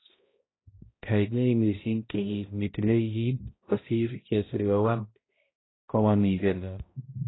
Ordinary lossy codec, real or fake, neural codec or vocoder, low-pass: AAC, 16 kbps; fake; codec, 16 kHz, 0.5 kbps, X-Codec, HuBERT features, trained on balanced general audio; 7.2 kHz